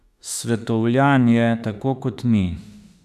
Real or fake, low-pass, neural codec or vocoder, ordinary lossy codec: fake; 14.4 kHz; autoencoder, 48 kHz, 32 numbers a frame, DAC-VAE, trained on Japanese speech; none